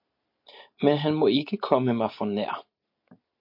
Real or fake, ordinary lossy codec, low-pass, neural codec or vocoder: real; MP3, 32 kbps; 5.4 kHz; none